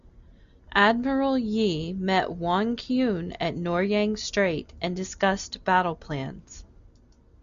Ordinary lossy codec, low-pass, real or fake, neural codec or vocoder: Opus, 64 kbps; 7.2 kHz; real; none